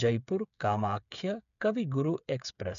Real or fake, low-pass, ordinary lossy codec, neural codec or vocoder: fake; 7.2 kHz; none; codec, 16 kHz, 8 kbps, FreqCodec, smaller model